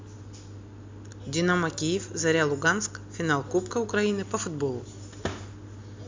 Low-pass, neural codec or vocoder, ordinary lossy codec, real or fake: 7.2 kHz; none; MP3, 64 kbps; real